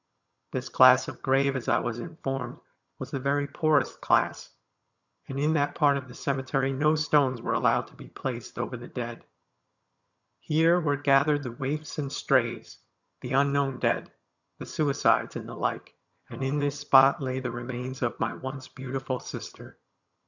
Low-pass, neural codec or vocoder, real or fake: 7.2 kHz; vocoder, 22.05 kHz, 80 mel bands, HiFi-GAN; fake